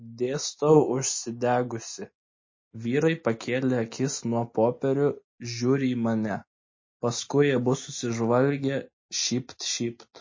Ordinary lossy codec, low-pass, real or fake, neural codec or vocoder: MP3, 32 kbps; 7.2 kHz; real; none